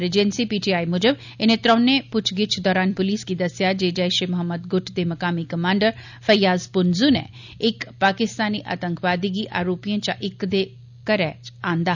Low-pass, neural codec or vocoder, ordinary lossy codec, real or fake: 7.2 kHz; none; none; real